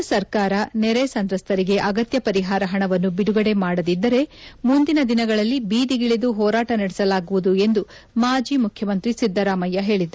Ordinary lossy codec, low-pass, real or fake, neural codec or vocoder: none; none; real; none